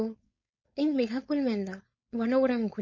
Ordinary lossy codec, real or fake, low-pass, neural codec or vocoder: MP3, 32 kbps; fake; 7.2 kHz; codec, 16 kHz, 4.8 kbps, FACodec